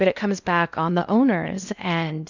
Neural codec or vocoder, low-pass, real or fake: codec, 16 kHz in and 24 kHz out, 0.6 kbps, FocalCodec, streaming, 2048 codes; 7.2 kHz; fake